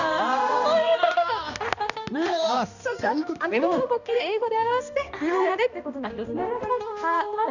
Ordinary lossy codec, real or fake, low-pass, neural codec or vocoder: none; fake; 7.2 kHz; codec, 16 kHz, 1 kbps, X-Codec, HuBERT features, trained on balanced general audio